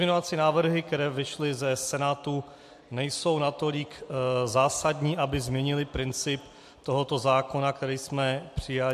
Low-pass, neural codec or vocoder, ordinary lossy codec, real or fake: 14.4 kHz; none; MP3, 64 kbps; real